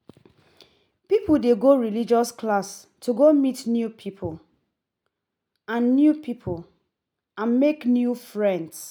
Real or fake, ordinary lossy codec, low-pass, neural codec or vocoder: real; none; 19.8 kHz; none